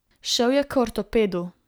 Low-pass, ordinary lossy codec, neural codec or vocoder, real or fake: none; none; none; real